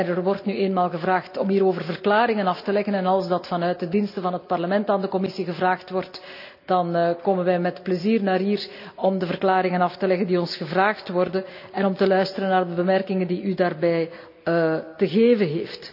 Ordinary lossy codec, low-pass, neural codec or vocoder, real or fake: none; 5.4 kHz; none; real